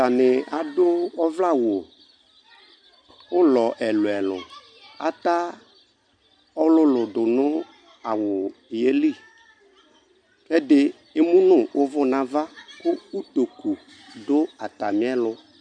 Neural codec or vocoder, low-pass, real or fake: none; 9.9 kHz; real